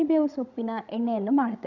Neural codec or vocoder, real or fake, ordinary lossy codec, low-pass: codec, 16 kHz, 16 kbps, FreqCodec, larger model; fake; none; 7.2 kHz